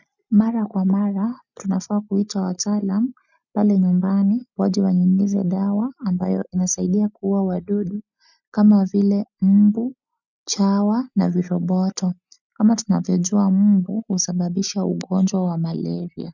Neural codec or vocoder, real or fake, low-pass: none; real; 7.2 kHz